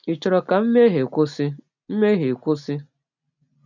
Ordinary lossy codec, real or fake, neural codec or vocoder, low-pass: none; real; none; 7.2 kHz